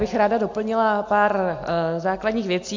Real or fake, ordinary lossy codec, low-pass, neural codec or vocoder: real; MP3, 48 kbps; 7.2 kHz; none